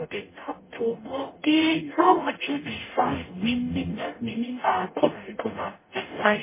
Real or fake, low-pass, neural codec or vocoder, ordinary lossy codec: fake; 3.6 kHz; codec, 44.1 kHz, 0.9 kbps, DAC; MP3, 16 kbps